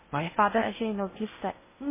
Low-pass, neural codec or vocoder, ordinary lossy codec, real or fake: 3.6 kHz; codec, 16 kHz in and 24 kHz out, 0.6 kbps, FocalCodec, streaming, 2048 codes; MP3, 16 kbps; fake